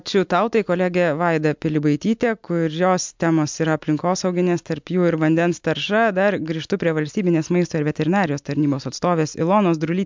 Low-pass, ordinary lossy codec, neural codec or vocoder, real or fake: 7.2 kHz; MP3, 64 kbps; none; real